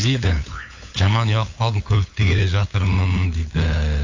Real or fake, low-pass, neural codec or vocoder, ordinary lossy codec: fake; 7.2 kHz; codec, 16 kHz, 8 kbps, FunCodec, trained on LibriTTS, 25 frames a second; AAC, 48 kbps